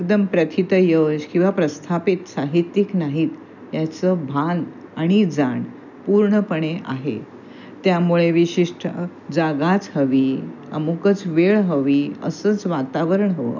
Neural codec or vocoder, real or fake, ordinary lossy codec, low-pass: none; real; none; 7.2 kHz